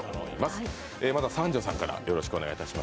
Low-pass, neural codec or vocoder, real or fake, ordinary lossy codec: none; none; real; none